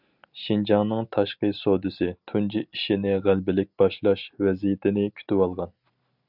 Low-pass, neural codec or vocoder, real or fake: 5.4 kHz; none; real